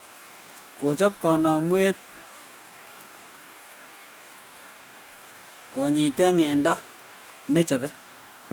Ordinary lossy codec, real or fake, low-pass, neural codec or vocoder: none; fake; none; codec, 44.1 kHz, 2.6 kbps, DAC